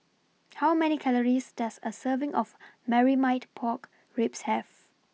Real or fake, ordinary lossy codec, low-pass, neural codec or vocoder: real; none; none; none